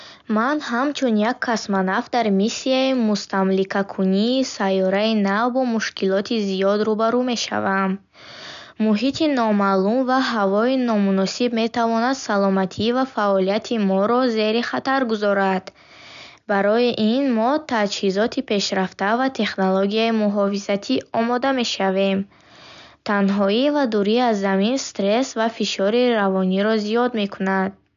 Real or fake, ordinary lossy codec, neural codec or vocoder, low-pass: real; none; none; 7.2 kHz